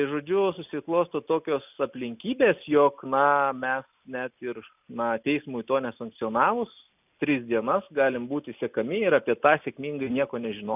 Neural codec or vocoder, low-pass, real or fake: none; 3.6 kHz; real